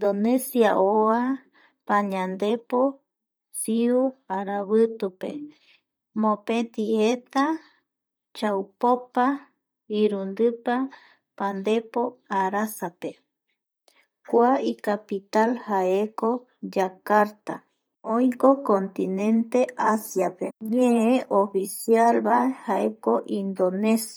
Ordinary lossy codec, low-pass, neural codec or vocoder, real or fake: none; none; vocoder, 44.1 kHz, 128 mel bands, Pupu-Vocoder; fake